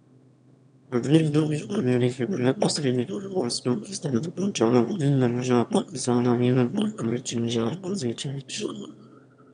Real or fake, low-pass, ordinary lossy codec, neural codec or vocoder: fake; 9.9 kHz; none; autoencoder, 22.05 kHz, a latent of 192 numbers a frame, VITS, trained on one speaker